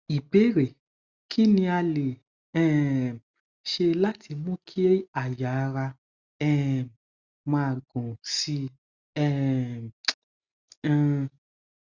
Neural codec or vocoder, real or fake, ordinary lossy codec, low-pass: none; real; Opus, 64 kbps; 7.2 kHz